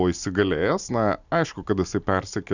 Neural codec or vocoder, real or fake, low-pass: none; real; 7.2 kHz